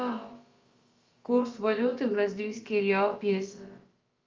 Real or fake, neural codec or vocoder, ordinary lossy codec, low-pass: fake; codec, 16 kHz, about 1 kbps, DyCAST, with the encoder's durations; Opus, 32 kbps; 7.2 kHz